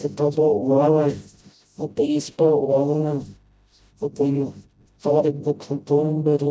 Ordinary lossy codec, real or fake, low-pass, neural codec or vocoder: none; fake; none; codec, 16 kHz, 0.5 kbps, FreqCodec, smaller model